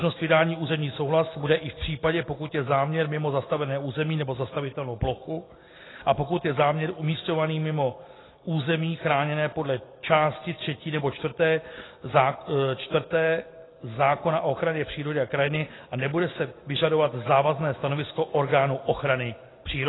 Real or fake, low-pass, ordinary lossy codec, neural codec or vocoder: real; 7.2 kHz; AAC, 16 kbps; none